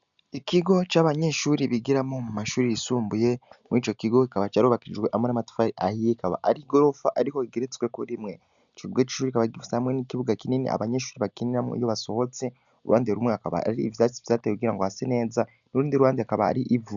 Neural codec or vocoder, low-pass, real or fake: none; 7.2 kHz; real